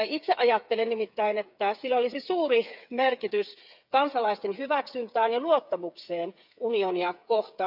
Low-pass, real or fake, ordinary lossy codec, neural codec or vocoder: 5.4 kHz; fake; none; codec, 16 kHz, 8 kbps, FreqCodec, smaller model